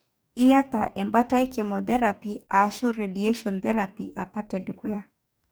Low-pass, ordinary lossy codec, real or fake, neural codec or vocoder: none; none; fake; codec, 44.1 kHz, 2.6 kbps, DAC